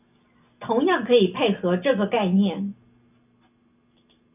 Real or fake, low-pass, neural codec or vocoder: real; 3.6 kHz; none